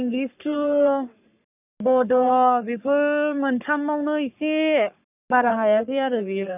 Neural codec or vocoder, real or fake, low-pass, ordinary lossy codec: codec, 44.1 kHz, 3.4 kbps, Pupu-Codec; fake; 3.6 kHz; none